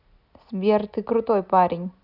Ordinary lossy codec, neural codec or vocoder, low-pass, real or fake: none; none; 5.4 kHz; real